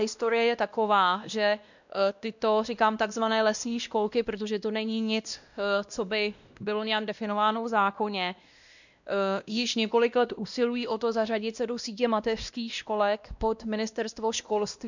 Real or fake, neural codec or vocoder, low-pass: fake; codec, 16 kHz, 1 kbps, X-Codec, WavLM features, trained on Multilingual LibriSpeech; 7.2 kHz